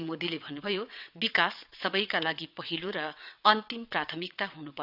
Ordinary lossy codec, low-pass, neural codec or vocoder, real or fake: none; 5.4 kHz; vocoder, 22.05 kHz, 80 mel bands, WaveNeXt; fake